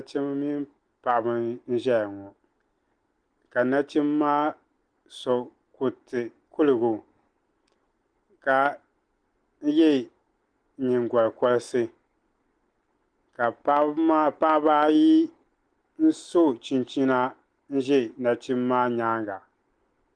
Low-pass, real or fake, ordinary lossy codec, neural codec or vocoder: 9.9 kHz; real; Opus, 24 kbps; none